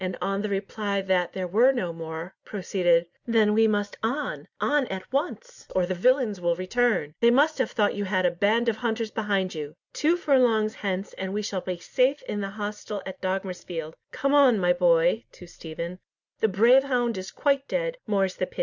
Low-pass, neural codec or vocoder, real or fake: 7.2 kHz; none; real